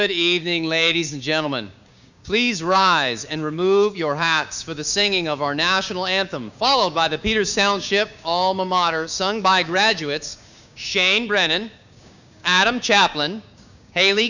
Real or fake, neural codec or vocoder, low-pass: fake; codec, 16 kHz, 6 kbps, DAC; 7.2 kHz